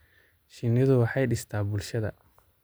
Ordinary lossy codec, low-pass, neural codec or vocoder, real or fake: none; none; none; real